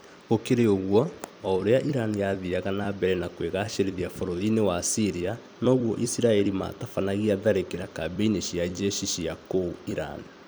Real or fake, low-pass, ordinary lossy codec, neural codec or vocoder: fake; none; none; vocoder, 44.1 kHz, 128 mel bands every 256 samples, BigVGAN v2